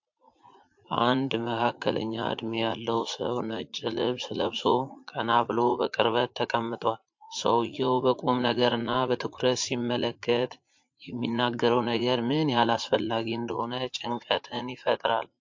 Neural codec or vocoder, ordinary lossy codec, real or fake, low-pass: vocoder, 44.1 kHz, 80 mel bands, Vocos; MP3, 48 kbps; fake; 7.2 kHz